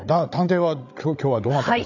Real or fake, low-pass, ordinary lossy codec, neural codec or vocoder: fake; 7.2 kHz; none; codec, 16 kHz, 8 kbps, FreqCodec, larger model